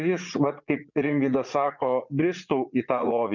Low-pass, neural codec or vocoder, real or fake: 7.2 kHz; vocoder, 44.1 kHz, 80 mel bands, Vocos; fake